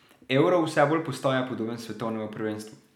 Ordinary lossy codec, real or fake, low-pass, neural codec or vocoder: none; real; 19.8 kHz; none